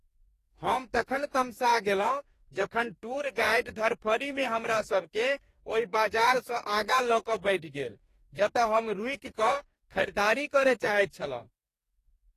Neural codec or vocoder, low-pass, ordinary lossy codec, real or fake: codec, 44.1 kHz, 2.6 kbps, DAC; 14.4 kHz; AAC, 48 kbps; fake